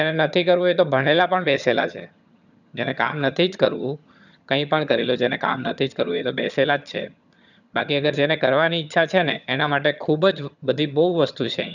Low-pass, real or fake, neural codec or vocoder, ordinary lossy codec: 7.2 kHz; fake; vocoder, 22.05 kHz, 80 mel bands, HiFi-GAN; none